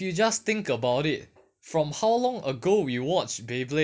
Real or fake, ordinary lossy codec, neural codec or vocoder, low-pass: real; none; none; none